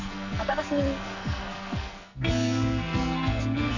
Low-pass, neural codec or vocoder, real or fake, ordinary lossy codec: 7.2 kHz; codec, 44.1 kHz, 2.6 kbps, SNAC; fake; none